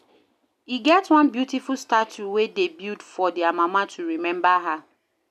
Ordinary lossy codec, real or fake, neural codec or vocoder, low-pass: none; real; none; 14.4 kHz